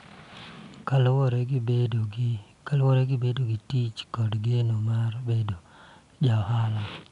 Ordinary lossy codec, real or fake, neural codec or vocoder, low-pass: none; real; none; 10.8 kHz